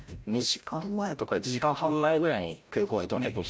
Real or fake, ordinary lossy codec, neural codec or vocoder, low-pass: fake; none; codec, 16 kHz, 0.5 kbps, FreqCodec, larger model; none